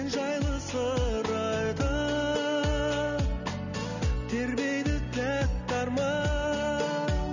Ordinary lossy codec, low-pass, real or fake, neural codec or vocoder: none; 7.2 kHz; real; none